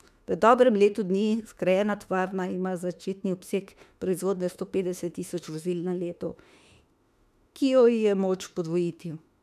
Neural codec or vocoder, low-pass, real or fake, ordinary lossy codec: autoencoder, 48 kHz, 32 numbers a frame, DAC-VAE, trained on Japanese speech; 14.4 kHz; fake; none